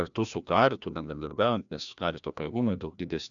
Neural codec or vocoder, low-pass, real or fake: codec, 16 kHz, 1 kbps, FreqCodec, larger model; 7.2 kHz; fake